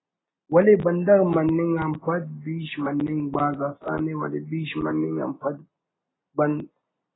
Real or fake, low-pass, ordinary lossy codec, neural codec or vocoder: real; 7.2 kHz; AAC, 16 kbps; none